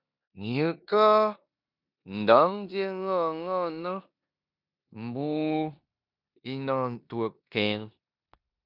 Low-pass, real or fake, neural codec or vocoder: 5.4 kHz; fake; codec, 16 kHz in and 24 kHz out, 0.9 kbps, LongCat-Audio-Codec, four codebook decoder